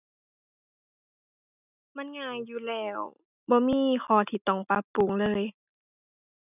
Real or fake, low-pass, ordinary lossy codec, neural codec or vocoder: real; 3.6 kHz; none; none